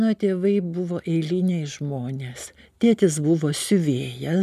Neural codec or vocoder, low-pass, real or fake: none; 14.4 kHz; real